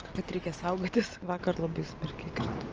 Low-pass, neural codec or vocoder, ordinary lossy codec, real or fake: 7.2 kHz; none; Opus, 24 kbps; real